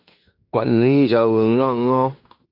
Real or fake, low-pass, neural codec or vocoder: fake; 5.4 kHz; codec, 16 kHz in and 24 kHz out, 0.9 kbps, LongCat-Audio-Codec, fine tuned four codebook decoder